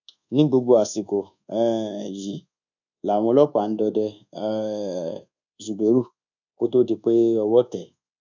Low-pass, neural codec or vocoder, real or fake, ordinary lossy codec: 7.2 kHz; codec, 24 kHz, 1.2 kbps, DualCodec; fake; none